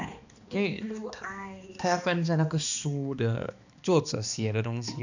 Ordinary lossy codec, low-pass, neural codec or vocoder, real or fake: none; 7.2 kHz; codec, 16 kHz, 2 kbps, X-Codec, HuBERT features, trained on balanced general audio; fake